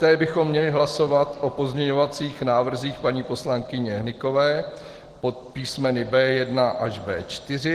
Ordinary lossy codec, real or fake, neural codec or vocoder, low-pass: Opus, 16 kbps; real; none; 14.4 kHz